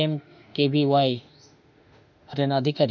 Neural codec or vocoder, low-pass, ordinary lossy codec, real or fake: autoencoder, 48 kHz, 32 numbers a frame, DAC-VAE, trained on Japanese speech; 7.2 kHz; none; fake